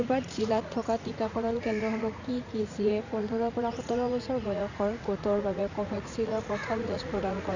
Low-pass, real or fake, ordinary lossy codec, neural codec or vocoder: 7.2 kHz; fake; none; vocoder, 44.1 kHz, 80 mel bands, Vocos